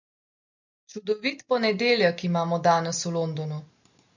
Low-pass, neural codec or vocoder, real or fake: 7.2 kHz; none; real